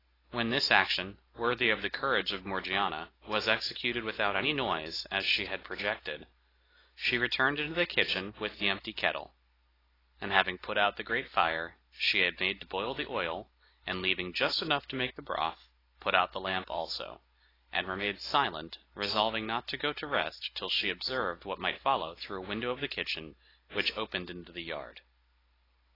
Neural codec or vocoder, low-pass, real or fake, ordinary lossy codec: none; 5.4 kHz; real; AAC, 24 kbps